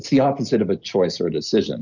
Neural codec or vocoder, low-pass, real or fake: none; 7.2 kHz; real